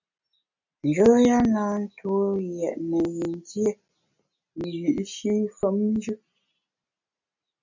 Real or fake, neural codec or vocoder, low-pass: real; none; 7.2 kHz